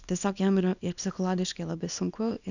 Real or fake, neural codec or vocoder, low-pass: fake; codec, 24 kHz, 0.9 kbps, WavTokenizer, small release; 7.2 kHz